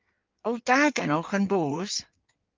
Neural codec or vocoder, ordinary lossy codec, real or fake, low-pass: codec, 16 kHz in and 24 kHz out, 1.1 kbps, FireRedTTS-2 codec; Opus, 32 kbps; fake; 7.2 kHz